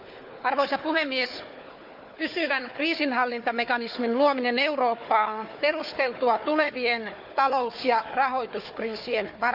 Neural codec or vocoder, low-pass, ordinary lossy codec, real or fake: codec, 16 kHz, 4 kbps, FunCodec, trained on Chinese and English, 50 frames a second; 5.4 kHz; none; fake